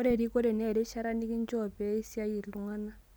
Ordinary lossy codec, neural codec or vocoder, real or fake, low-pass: none; none; real; none